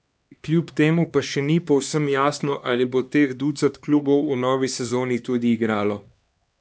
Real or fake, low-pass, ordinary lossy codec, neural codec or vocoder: fake; none; none; codec, 16 kHz, 2 kbps, X-Codec, HuBERT features, trained on LibriSpeech